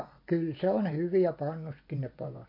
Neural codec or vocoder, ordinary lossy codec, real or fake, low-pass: vocoder, 24 kHz, 100 mel bands, Vocos; MP3, 32 kbps; fake; 5.4 kHz